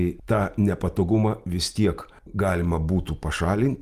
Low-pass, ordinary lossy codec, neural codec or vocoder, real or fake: 14.4 kHz; Opus, 32 kbps; none; real